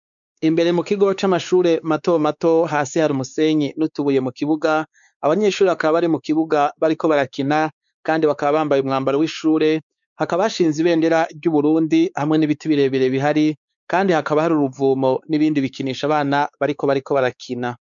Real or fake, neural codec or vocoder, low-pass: fake; codec, 16 kHz, 4 kbps, X-Codec, WavLM features, trained on Multilingual LibriSpeech; 7.2 kHz